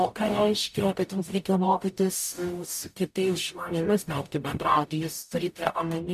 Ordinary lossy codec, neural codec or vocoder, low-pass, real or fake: MP3, 96 kbps; codec, 44.1 kHz, 0.9 kbps, DAC; 14.4 kHz; fake